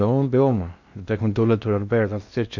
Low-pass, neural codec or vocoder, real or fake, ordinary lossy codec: 7.2 kHz; codec, 16 kHz in and 24 kHz out, 0.6 kbps, FocalCodec, streaming, 2048 codes; fake; none